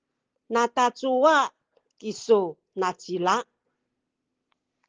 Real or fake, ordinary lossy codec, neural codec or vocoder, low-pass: real; Opus, 16 kbps; none; 7.2 kHz